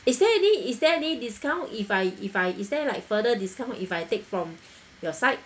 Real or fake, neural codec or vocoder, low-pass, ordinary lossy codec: real; none; none; none